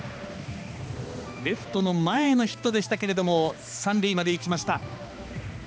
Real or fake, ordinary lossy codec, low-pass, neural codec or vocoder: fake; none; none; codec, 16 kHz, 2 kbps, X-Codec, HuBERT features, trained on balanced general audio